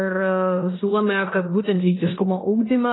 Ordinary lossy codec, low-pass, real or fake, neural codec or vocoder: AAC, 16 kbps; 7.2 kHz; fake; codec, 16 kHz in and 24 kHz out, 0.9 kbps, LongCat-Audio-Codec, four codebook decoder